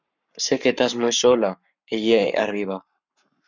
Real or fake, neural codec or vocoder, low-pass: fake; codec, 44.1 kHz, 7.8 kbps, Pupu-Codec; 7.2 kHz